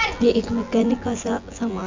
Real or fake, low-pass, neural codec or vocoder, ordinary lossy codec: fake; 7.2 kHz; vocoder, 24 kHz, 100 mel bands, Vocos; MP3, 64 kbps